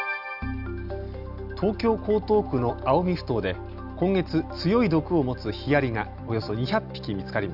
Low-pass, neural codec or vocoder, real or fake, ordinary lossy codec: 5.4 kHz; none; real; none